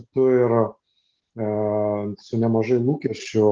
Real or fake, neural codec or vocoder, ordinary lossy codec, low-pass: real; none; Opus, 16 kbps; 7.2 kHz